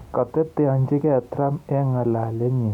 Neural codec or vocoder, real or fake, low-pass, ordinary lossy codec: none; real; 19.8 kHz; none